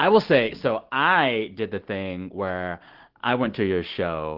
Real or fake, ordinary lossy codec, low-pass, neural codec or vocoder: real; Opus, 16 kbps; 5.4 kHz; none